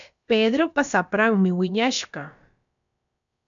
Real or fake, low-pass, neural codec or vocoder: fake; 7.2 kHz; codec, 16 kHz, about 1 kbps, DyCAST, with the encoder's durations